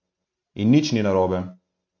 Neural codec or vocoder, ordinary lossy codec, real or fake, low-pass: none; MP3, 48 kbps; real; 7.2 kHz